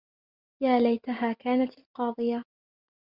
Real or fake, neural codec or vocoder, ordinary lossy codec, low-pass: real; none; Opus, 64 kbps; 5.4 kHz